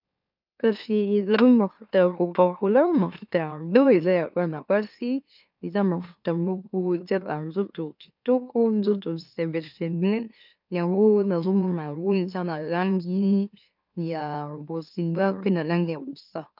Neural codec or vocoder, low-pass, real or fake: autoencoder, 44.1 kHz, a latent of 192 numbers a frame, MeloTTS; 5.4 kHz; fake